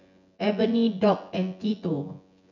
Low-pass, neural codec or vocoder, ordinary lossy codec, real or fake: 7.2 kHz; vocoder, 24 kHz, 100 mel bands, Vocos; none; fake